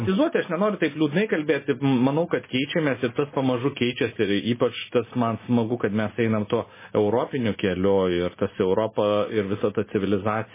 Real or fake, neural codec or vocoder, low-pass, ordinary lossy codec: real; none; 3.6 kHz; MP3, 16 kbps